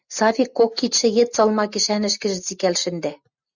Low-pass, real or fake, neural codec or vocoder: 7.2 kHz; real; none